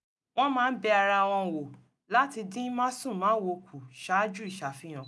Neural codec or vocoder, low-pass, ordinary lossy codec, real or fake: none; none; none; real